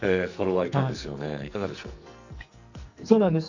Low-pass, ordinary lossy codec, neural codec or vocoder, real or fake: 7.2 kHz; none; codec, 44.1 kHz, 2.6 kbps, SNAC; fake